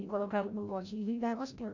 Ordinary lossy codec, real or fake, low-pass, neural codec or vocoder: none; fake; 7.2 kHz; codec, 16 kHz, 0.5 kbps, FreqCodec, larger model